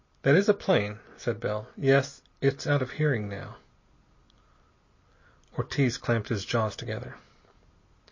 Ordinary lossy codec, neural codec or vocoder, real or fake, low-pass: MP3, 32 kbps; none; real; 7.2 kHz